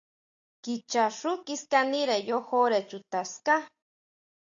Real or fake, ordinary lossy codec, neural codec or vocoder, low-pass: real; AAC, 64 kbps; none; 7.2 kHz